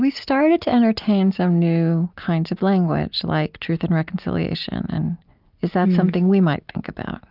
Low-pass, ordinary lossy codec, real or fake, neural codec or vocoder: 5.4 kHz; Opus, 24 kbps; real; none